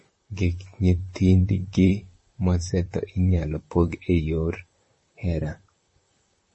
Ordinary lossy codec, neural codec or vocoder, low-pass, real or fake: MP3, 32 kbps; vocoder, 44.1 kHz, 128 mel bands, Pupu-Vocoder; 10.8 kHz; fake